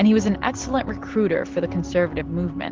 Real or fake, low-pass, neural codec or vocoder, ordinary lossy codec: real; 7.2 kHz; none; Opus, 16 kbps